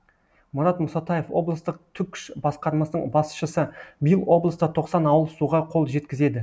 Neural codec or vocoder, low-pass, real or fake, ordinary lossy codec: none; none; real; none